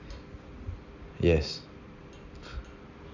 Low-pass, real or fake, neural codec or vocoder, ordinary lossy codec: 7.2 kHz; real; none; none